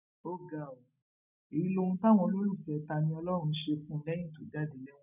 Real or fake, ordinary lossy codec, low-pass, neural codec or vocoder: real; none; 3.6 kHz; none